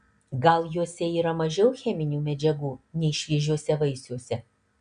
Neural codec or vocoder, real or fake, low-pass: none; real; 9.9 kHz